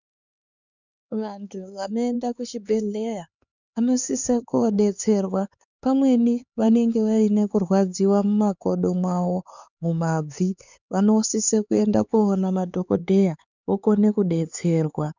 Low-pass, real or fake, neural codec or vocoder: 7.2 kHz; fake; codec, 16 kHz, 4 kbps, X-Codec, HuBERT features, trained on LibriSpeech